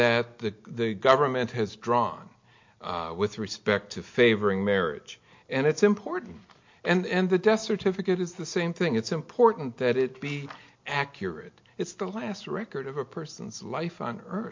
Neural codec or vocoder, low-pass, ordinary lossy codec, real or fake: none; 7.2 kHz; MP3, 48 kbps; real